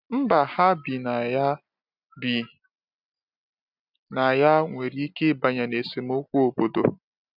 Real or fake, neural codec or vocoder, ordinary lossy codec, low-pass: real; none; none; 5.4 kHz